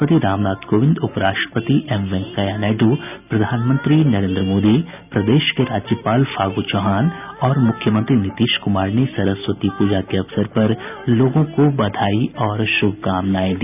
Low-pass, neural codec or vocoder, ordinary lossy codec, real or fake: 3.6 kHz; none; none; real